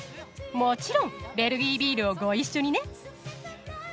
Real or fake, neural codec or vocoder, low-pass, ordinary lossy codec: real; none; none; none